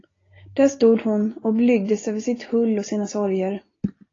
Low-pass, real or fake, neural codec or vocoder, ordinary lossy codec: 7.2 kHz; real; none; AAC, 32 kbps